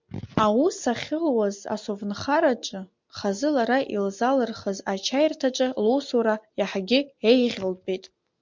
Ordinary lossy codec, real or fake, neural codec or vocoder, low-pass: MP3, 64 kbps; real; none; 7.2 kHz